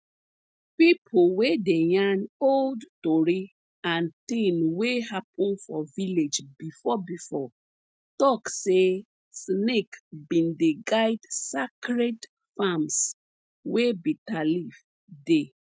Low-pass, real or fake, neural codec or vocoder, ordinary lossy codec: none; real; none; none